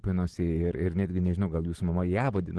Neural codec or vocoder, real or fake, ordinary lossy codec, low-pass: none; real; Opus, 16 kbps; 10.8 kHz